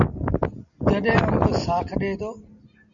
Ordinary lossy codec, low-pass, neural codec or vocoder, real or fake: MP3, 96 kbps; 7.2 kHz; none; real